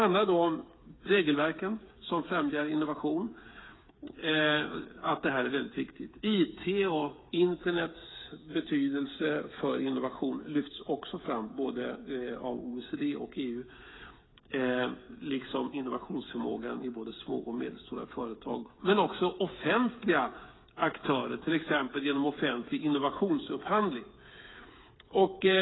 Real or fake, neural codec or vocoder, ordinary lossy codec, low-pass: fake; codec, 16 kHz, 8 kbps, FreqCodec, smaller model; AAC, 16 kbps; 7.2 kHz